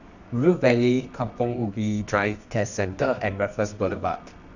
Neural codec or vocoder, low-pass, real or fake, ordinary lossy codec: codec, 24 kHz, 0.9 kbps, WavTokenizer, medium music audio release; 7.2 kHz; fake; none